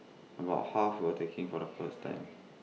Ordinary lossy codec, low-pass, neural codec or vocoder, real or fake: none; none; none; real